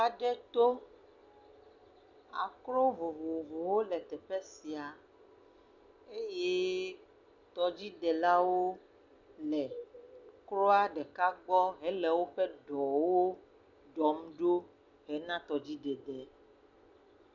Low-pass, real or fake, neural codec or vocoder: 7.2 kHz; real; none